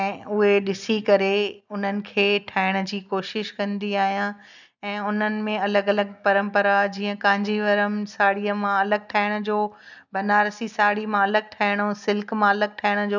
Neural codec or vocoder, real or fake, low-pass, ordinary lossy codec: none; real; 7.2 kHz; none